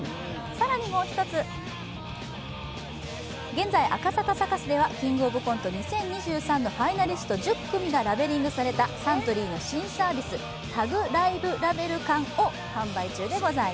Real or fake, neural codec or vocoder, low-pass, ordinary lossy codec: real; none; none; none